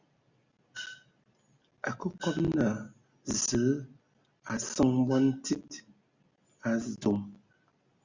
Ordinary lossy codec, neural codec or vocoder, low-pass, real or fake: Opus, 64 kbps; none; 7.2 kHz; real